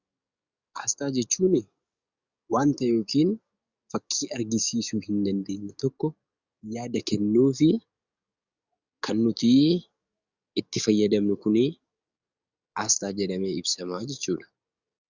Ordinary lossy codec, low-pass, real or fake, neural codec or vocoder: Opus, 64 kbps; 7.2 kHz; fake; codec, 44.1 kHz, 7.8 kbps, DAC